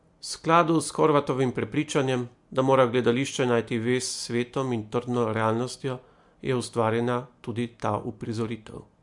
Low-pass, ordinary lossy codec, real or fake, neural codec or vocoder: 10.8 kHz; MP3, 64 kbps; real; none